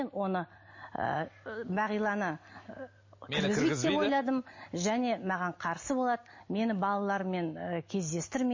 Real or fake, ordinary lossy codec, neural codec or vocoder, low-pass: real; MP3, 32 kbps; none; 7.2 kHz